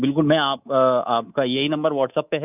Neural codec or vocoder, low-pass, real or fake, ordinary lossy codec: codec, 24 kHz, 3.1 kbps, DualCodec; 3.6 kHz; fake; none